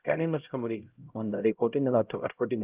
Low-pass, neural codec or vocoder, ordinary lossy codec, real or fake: 3.6 kHz; codec, 16 kHz, 0.5 kbps, X-Codec, HuBERT features, trained on LibriSpeech; Opus, 32 kbps; fake